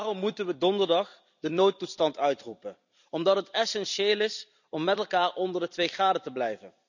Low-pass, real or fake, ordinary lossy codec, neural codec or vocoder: 7.2 kHz; fake; none; vocoder, 44.1 kHz, 128 mel bands every 256 samples, BigVGAN v2